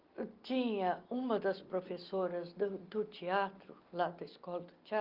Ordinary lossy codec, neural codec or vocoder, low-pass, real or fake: Opus, 32 kbps; none; 5.4 kHz; real